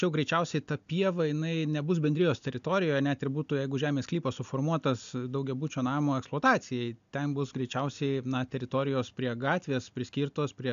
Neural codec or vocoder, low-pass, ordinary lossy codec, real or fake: none; 7.2 kHz; AAC, 96 kbps; real